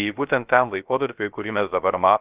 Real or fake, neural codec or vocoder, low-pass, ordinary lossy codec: fake; codec, 16 kHz, 0.3 kbps, FocalCodec; 3.6 kHz; Opus, 24 kbps